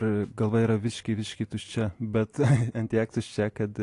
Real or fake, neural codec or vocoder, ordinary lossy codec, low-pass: real; none; AAC, 48 kbps; 10.8 kHz